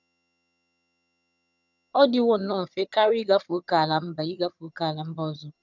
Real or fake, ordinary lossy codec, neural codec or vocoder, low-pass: fake; none; vocoder, 22.05 kHz, 80 mel bands, HiFi-GAN; 7.2 kHz